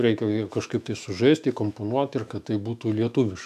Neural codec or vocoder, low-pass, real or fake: autoencoder, 48 kHz, 128 numbers a frame, DAC-VAE, trained on Japanese speech; 14.4 kHz; fake